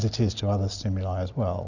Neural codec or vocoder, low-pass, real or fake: none; 7.2 kHz; real